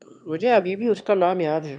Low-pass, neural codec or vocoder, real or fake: 9.9 kHz; autoencoder, 22.05 kHz, a latent of 192 numbers a frame, VITS, trained on one speaker; fake